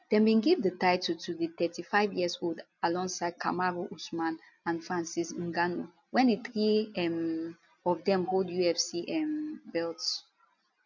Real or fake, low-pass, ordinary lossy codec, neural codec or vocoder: real; 7.2 kHz; none; none